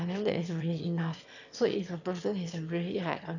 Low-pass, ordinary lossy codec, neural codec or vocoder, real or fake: 7.2 kHz; none; autoencoder, 22.05 kHz, a latent of 192 numbers a frame, VITS, trained on one speaker; fake